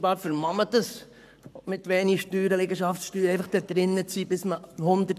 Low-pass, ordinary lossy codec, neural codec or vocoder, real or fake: 14.4 kHz; none; codec, 44.1 kHz, 7.8 kbps, Pupu-Codec; fake